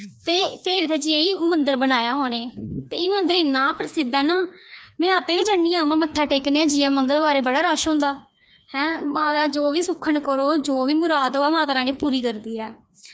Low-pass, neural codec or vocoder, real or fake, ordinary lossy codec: none; codec, 16 kHz, 2 kbps, FreqCodec, larger model; fake; none